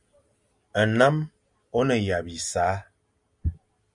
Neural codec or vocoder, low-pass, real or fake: none; 10.8 kHz; real